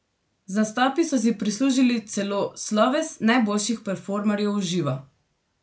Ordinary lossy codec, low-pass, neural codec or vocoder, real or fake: none; none; none; real